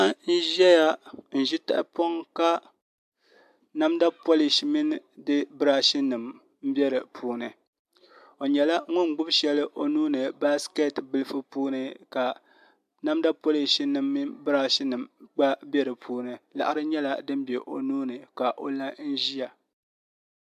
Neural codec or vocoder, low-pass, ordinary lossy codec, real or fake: none; 14.4 kHz; AAC, 96 kbps; real